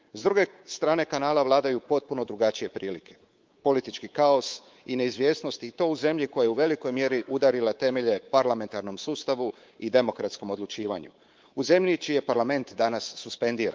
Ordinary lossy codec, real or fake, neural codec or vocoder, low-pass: Opus, 32 kbps; fake; codec, 24 kHz, 3.1 kbps, DualCodec; 7.2 kHz